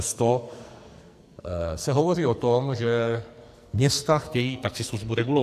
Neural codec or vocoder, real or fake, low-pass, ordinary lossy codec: codec, 44.1 kHz, 2.6 kbps, SNAC; fake; 14.4 kHz; AAC, 96 kbps